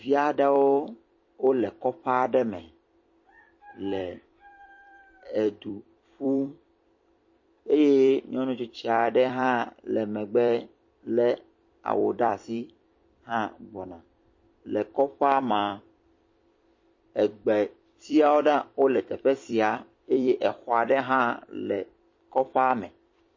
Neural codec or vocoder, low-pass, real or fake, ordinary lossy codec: vocoder, 44.1 kHz, 128 mel bands every 256 samples, BigVGAN v2; 7.2 kHz; fake; MP3, 32 kbps